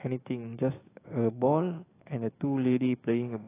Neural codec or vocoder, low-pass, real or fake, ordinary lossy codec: codec, 16 kHz, 6 kbps, DAC; 3.6 kHz; fake; AAC, 16 kbps